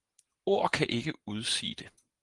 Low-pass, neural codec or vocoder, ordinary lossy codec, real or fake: 10.8 kHz; vocoder, 44.1 kHz, 128 mel bands every 512 samples, BigVGAN v2; Opus, 24 kbps; fake